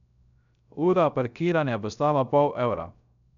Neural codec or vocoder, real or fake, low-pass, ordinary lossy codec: codec, 16 kHz, 0.3 kbps, FocalCodec; fake; 7.2 kHz; none